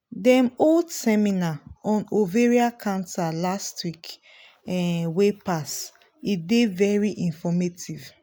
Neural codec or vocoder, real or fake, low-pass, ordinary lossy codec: none; real; none; none